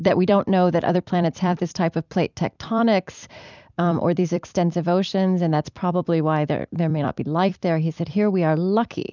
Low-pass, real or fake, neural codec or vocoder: 7.2 kHz; fake; vocoder, 44.1 kHz, 128 mel bands every 256 samples, BigVGAN v2